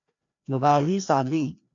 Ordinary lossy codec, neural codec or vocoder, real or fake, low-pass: MP3, 96 kbps; codec, 16 kHz, 1 kbps, FreqCodec, larger model; fake; 7.2 kHz